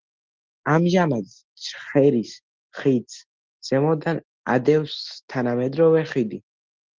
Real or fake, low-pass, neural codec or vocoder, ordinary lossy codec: real; 7.2 kHz; none; Opus, 16 kbps